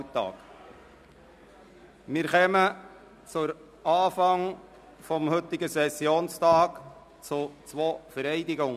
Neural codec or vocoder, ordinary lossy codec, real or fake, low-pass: none; none; real; 14.4 kHz